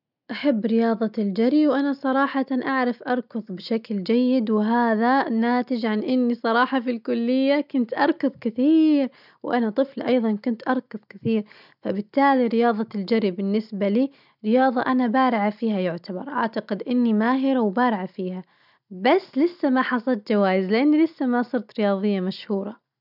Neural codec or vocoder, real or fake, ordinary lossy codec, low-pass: none; real; none; 5.4 kHz